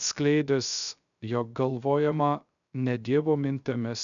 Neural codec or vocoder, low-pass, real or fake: codec, 16 kHz, 0.3 kbps, FocalCodec; 7.2 kHz; fake